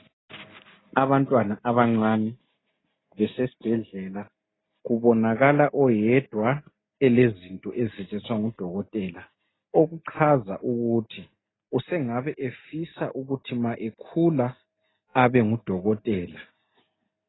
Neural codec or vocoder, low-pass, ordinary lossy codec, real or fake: none; 7.2 kHz; AAC, 16 kbps; real